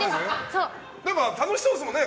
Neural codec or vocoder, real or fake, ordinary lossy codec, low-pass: none; real; none; none